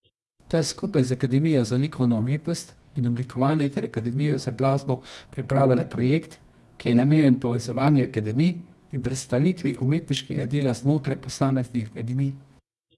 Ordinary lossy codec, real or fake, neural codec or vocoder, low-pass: none; fake; codec, 24 kHz, 0.9 kbps, WavTokenizer, medium music audio release; none